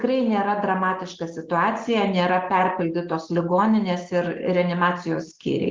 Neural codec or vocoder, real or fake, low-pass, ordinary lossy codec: none; real; 7.2 kHz; Opus, 16 kbps